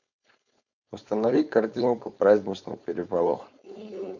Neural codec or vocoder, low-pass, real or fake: codec, 16 kHz, 4.8 kbps, FACodec; 7.2 kHz; fake